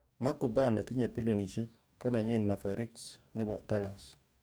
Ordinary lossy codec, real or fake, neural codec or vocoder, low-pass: none; fake; codec, 44.1 kHz, 2.6 kbps, DAC; none